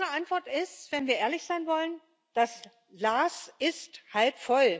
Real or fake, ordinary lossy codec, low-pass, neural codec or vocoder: real; none; none; none